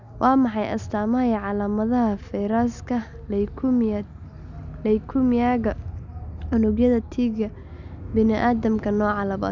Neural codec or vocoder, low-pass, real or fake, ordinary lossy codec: none; 7.2 kHz; real; none